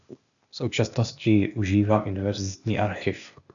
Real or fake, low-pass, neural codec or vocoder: fake; 7.2 kHz; codec, 16 kHz, 0.8 kbps, ZipCodec